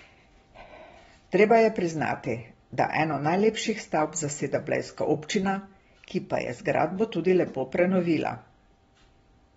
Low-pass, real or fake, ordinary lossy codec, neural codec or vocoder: 19.8 kHz; real; AAC, 24 kbps; none